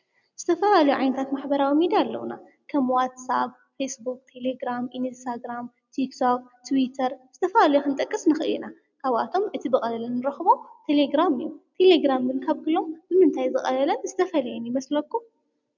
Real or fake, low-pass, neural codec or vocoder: real; 7.2 kHz; none